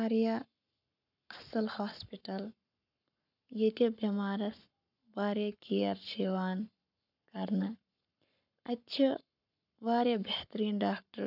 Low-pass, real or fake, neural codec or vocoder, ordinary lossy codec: 5.4 kHz; fake; codec, 44.1 kHz, 7.8 kbps, Pupu-Codec; none